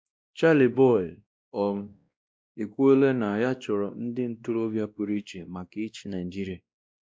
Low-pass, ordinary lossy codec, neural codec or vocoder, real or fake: none; none; codec, 16 kHz, 1 kbps, X-Codec, WavLM features, trained on Multilingual LibriSpeech; fake